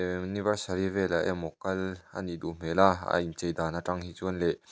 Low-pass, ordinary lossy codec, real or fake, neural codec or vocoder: none; none; real; none